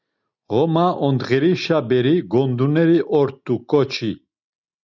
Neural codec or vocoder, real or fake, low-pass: none; real; 7.2 kHz